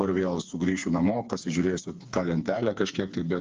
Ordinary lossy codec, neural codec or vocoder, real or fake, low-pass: Opus, 24 kbps; codec, 16 kHz, 4 kbps, FreqCodec, smaller model; fake; 7.2 kHz